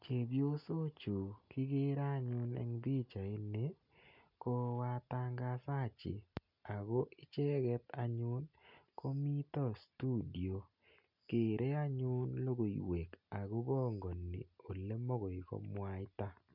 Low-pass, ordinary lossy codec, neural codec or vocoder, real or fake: 5.4 kHz; none; none; real